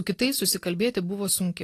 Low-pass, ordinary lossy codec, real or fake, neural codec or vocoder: 14.4 kHz; AAC, 48 kbps; real; none